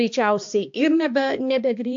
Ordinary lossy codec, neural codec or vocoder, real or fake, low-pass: AAC, 64 kbps; codec, 16 kHz, 2 kbps, X-Codec, HuBERT features, trained on balanced general audio; fake; 7.2 kHz